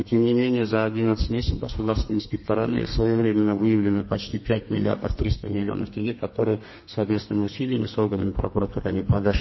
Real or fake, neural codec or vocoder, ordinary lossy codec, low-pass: fake; codec, 32 kHz, 1.9 kbps, SNAC; MP3, 24 kbps; 7.2 kHz